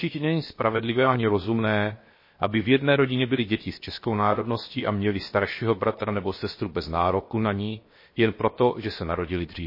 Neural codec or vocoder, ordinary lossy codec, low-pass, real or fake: codec, 16 kHz, 0.7 kbps, FocalCodec; MP3, 24 kbps; 5.4 kHz; fake